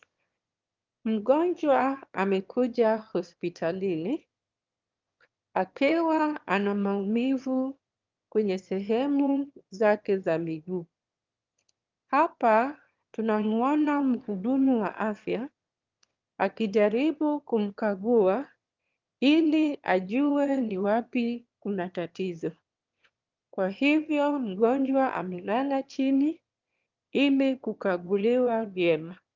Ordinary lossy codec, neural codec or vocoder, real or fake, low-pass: Opus, 32 kbps; autoencoder, 22.05 kHz, a latent of 192 numbers a frame, VITS, trained on one speaker; fake; 7.2 kHz